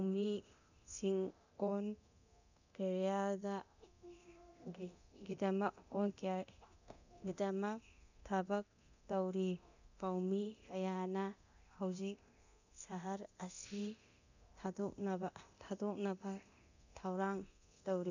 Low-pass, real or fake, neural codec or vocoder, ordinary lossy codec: 7.2 kHz; fake; codec, 24 kHz, 0.9 kbps, DualCodec; none